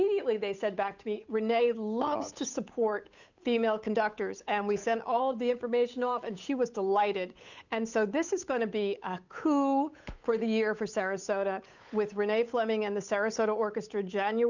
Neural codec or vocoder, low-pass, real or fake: codec, 16 kHz, 8 kbps, FunCodec, trained on Chinese and English, 25 frames a second; 7.2 kHz; fake